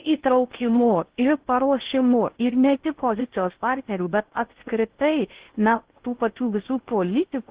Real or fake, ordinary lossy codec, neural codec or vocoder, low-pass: fake; Opus, 16 kbps; codec, 16 kHz in and 24 kHz out, 0.6 kbps, FocalCodec, streaming, 4096 codes; 3.6 kHz